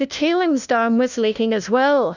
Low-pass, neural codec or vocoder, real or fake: 7.2 kHz; codec, 16 kHz, 1 kbps, FunCodec, trained on LibriTTS, 50 frames a second; fake